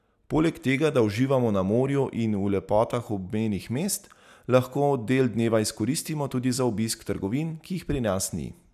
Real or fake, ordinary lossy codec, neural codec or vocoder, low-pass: real; none; none; 14.4 kHz